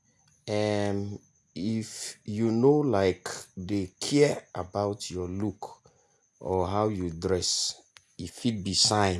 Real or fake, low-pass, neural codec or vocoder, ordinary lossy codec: real; none; none; none